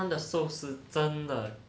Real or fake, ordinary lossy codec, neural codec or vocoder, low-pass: real; none; none; none